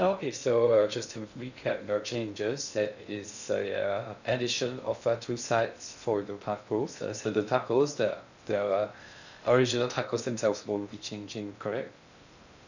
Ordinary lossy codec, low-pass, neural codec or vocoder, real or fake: none; 7.2 kHz; codec, 16 kHz in and 24 kHz out, 0.6 kbps, FocalCodec, streaming, 2048 codes; fake